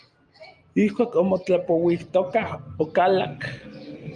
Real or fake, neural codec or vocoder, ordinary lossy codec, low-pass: real; none; Opus, 32 kbps; 9.9 kHz